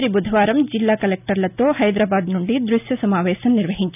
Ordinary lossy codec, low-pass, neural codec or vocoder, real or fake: none; 3.6 kHz; none; real